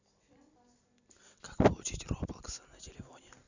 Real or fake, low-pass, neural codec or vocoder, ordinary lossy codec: real; 7.2 kHz; none; none